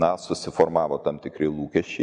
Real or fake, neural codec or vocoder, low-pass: real; none; 9.9 kHz